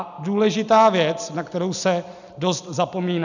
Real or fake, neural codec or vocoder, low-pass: real; none; 7.2 kHz